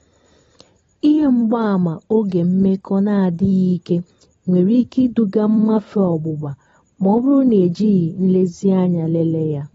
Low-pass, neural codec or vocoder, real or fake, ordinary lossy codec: 19.8 kHz; vocoder, 44.1 kHz, 128 mel bands every 512 samples, BigVGAN v2; fake; AAC, 24 kbps